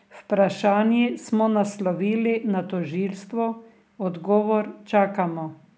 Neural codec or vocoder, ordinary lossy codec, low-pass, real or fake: none; none; none; real